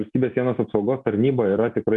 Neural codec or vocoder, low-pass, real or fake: none; 10.8 kHz; real